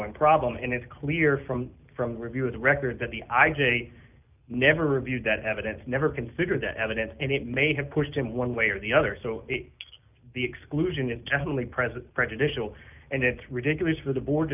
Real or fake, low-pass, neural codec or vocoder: real; 3.6 kHz; none